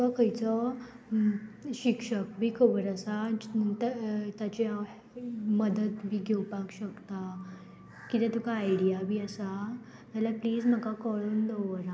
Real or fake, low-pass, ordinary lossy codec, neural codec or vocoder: real; none; none; none